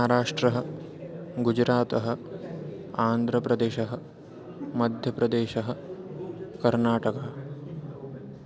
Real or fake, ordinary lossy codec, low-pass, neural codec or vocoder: real; none; none; none